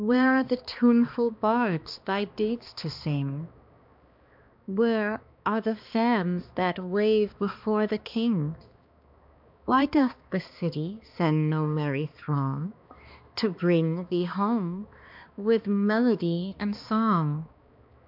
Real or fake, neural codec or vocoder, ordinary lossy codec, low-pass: fake; codec, 16 kHz, 2 kbps, X-Codec, HuBERT features, trained on balanced general audio; MP3, 48 kbps; 5.4 kHz